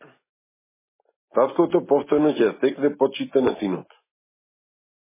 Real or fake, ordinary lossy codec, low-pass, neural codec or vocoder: real; MP3, 16 kbps; 3.6 kHz; none